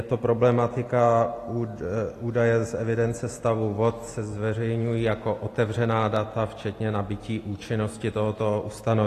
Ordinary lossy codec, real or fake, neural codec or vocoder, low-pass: AAC, 48 kbps; real; none; 14.4 kHz